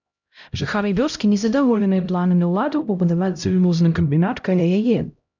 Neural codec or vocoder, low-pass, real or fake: codec, 16 kHz, 0.5 kbps, X-Codec, HuBERT features, trained on LibriSpeech; 7.2 kHz; fake